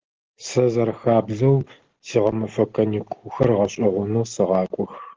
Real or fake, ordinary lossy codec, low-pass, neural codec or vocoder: real; Opus, 16 kbps; 7.2 kHz; none